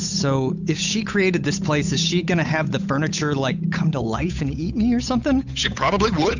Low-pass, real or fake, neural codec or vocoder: 7.2 kHz; fake; codec, 16 kHz, 8 kbps, FunCodec, trained on Chinese and English, 25 frames a second